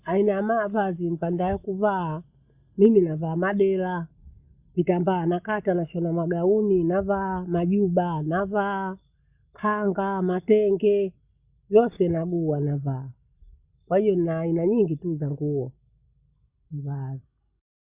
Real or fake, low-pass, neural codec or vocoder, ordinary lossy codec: real; 3.6 kHz; none; Opus, 64 kbps